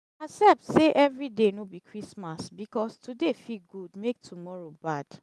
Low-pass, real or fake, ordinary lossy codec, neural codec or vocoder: none; real; none; none